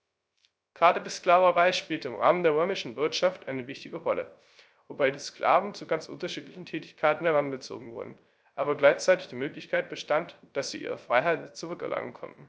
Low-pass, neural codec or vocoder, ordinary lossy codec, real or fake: none; codec, 16 kHz, 0.3 kbps, FocalCodec; none; fake